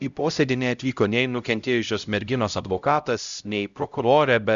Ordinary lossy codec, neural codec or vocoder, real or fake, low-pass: Opus, 64 kbps; codec, 16 kHz, 0.5 kbps, X-Codec, HuBERT features, trained on LibriSpeech; fake; 7.2 kHz